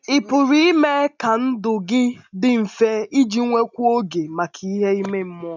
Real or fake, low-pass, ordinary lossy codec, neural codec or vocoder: real; 7.2 kHz; none; none